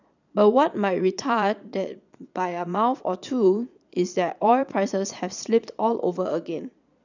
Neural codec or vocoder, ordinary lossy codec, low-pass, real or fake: vocoder, 22.05 kHz, 80 mel bands, Vocos; none; 7.2 kHz; fake